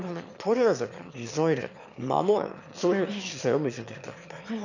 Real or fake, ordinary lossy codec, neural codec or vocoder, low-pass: fake; none; autoencoder, 22.05 kHz, a latent of 192 numbers a frame, VITS, trained on one speaker; 7.2 kHz